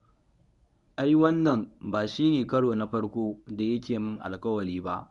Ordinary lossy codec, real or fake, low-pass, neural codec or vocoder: none; fake; 10.8 kHz; codec, 24 kHz, 0.9 kbps, WavTokenizer, medium speech release version 1